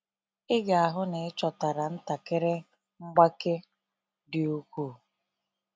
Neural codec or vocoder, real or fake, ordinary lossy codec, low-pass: none; real; none; none